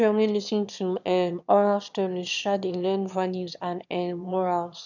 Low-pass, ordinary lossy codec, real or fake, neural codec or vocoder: 7.2 kHz; none; fake; autoencoder, 22.05 kHz, a latent of 192 numbers a frame, VITS, trained on one speaker